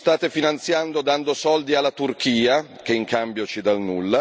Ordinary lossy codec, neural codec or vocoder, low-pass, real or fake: none; none; none; real